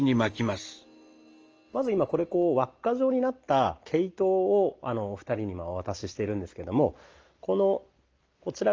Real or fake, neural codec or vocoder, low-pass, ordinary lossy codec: real; none; 7.2 kHz; Opus, 24 kbps